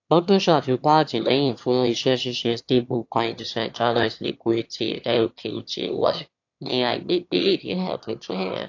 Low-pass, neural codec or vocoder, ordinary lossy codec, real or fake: 7.2 kHz; autoencoder, 22.05 kHz, a latent of 192 numbers a frame, VITS, trained on one speaker; AAC, 48 kbps; fake